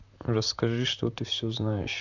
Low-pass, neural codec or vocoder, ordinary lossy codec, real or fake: 7.2 kHz; vocoder, 44.1 kHz, 128 mel bands, Pupu-Vocoder; none; fake